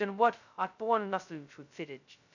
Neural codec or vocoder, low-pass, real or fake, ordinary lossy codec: codec, 16 kHz, 0.2 kbps, FocalCodec; 7.2 kHz; fake; none